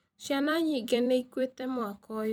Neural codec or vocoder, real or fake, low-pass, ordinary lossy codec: vocoder, 44.1 kHz, 128 mel bands every 256 samples, BigVGAN v2; fake; none; none